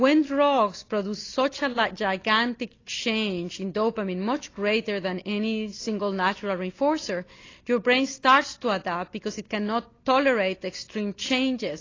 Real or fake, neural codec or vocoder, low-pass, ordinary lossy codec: real; none; 7.2 kHz; AAC, 32 kbps